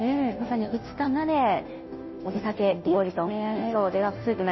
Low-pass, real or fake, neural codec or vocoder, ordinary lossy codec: 7.2 kHz; fake; codec, 16 kHz, 0.5 kbps, FunCodec, trained on Chinese and English, 25 frames a second; MP3, 24 kbps